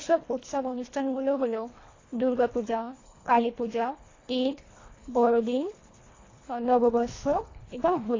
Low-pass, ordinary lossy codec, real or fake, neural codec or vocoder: 7.2 kHz; AAC, 32 kbps; fake; codec, 24 kHz, 1.5 kbps, HILCodec